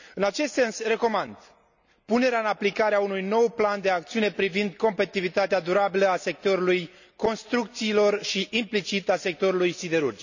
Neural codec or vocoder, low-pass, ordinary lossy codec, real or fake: none; 7.2 kHz; none; real